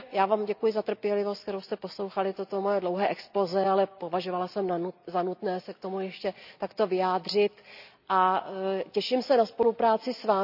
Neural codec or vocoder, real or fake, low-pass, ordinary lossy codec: none; real; 5.4 kHz; none